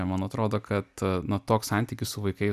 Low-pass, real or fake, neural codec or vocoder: 14.4 kHz; fake; vocoder, 44.1 kHz, 128 mel bands every 256 samples, BigVGAN v2